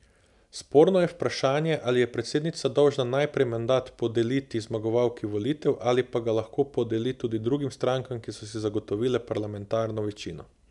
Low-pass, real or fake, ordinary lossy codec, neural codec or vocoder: 10.8 kHz; real; none; none